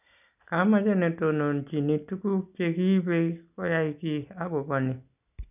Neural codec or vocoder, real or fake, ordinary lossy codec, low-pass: none; real; none; 3.6 kHz